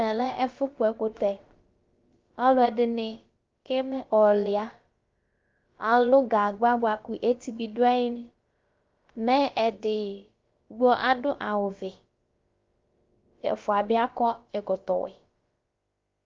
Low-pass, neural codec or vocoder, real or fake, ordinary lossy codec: 7.2 kHz; codec, 16 kHz, about 1 kbps, DyCAST, with the encoder's durations; fake; Opus, 32 kbps